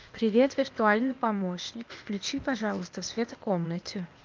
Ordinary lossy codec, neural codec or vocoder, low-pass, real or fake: Opus, 24 kbps; codec, 16 kHz, 0.8 kbps, ZipCodec; 7.2 kHz; fake